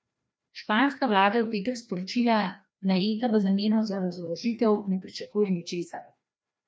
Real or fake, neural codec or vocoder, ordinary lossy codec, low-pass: fake; codec, 16 kHz, 1 kbps, FreqCodec, larger model; none; none